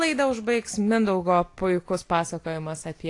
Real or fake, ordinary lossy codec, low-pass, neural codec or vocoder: real; AAC, 48 kbps; 10.8 kHz; none